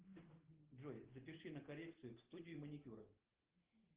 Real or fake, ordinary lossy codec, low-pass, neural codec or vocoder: real; Opus, 16 kbps; 3.6 kHz; none